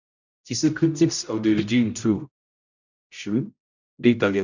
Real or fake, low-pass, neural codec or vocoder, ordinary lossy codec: fake; 7.2 kHz; codec, 16 kHz, 0.5 kbps, X-Codec, HuBERT features, trained on general audio; none